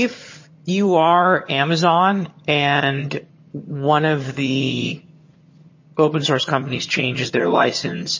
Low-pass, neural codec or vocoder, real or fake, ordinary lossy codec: 7.2 kHz; vocoder, 22.05 kHz, 80 mel bands, HiFi-GAN; fake; MP3, 32 kbps